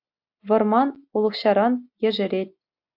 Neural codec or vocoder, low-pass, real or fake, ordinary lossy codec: none; 5.4 kHz; real; AAC, 48 kbps